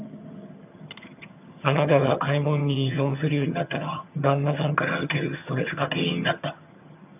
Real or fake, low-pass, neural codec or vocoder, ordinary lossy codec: fake; 3.6 kHz; vocoder, 22.05 kHz, 80 mel bands, HiFi-GAN; none